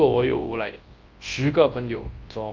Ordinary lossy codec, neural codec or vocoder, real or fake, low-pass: Opus, 24 kbps; codec, 24 kHz, 0.9 kbps, WavTokenizer, large speech release; fake; 7.2 kHz